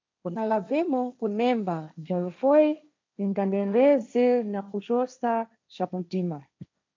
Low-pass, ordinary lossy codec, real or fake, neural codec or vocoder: 7.2 kHz; MP3, 64 kbps; fake; codec, 16 kHz, 1.1 kbps, Voila-Tokenizer